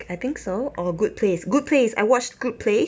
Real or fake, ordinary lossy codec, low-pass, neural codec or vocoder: real; none; none; none